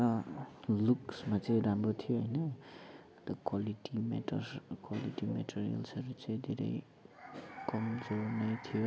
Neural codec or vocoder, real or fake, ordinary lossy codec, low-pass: none; real; none; none